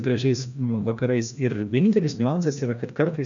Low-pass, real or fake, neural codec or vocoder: 7.2 kHz; fake; codec, 16 kHz, 1 kbps, FreqCodec, larger model